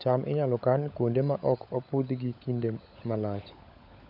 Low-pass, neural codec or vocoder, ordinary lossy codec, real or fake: 5.4 kHz; codec, 16 kHz, 16 kbps, FunCodec, trained on LibriTTS, 50 frames a second; none; fake